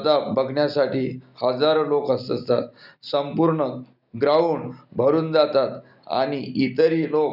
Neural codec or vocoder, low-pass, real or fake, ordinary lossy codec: none; 5.4 kHz; real; none